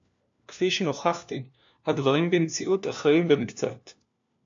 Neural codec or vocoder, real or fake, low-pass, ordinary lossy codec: codec, 16 kHz, 1 kbps, FunCodec, trained on LibriTTS, 50 frames a second; fake; 7.2 kHz; AAC, 64 kbps